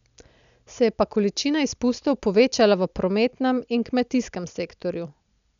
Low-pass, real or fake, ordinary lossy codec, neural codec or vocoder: 7.2 kHz; real; none; none